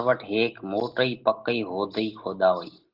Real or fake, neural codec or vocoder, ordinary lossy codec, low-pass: real; none; Opus, 16 kbps; 5.4 kHz